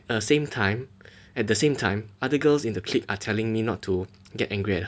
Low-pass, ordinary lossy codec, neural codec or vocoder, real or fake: none; none; none; real